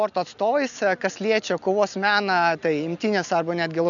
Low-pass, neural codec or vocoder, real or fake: 7.2 kHz; none; real